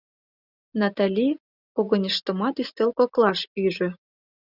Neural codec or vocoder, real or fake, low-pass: none; real; 5.4 kHz